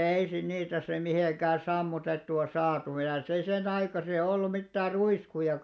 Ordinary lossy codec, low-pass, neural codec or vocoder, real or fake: none; none; none; real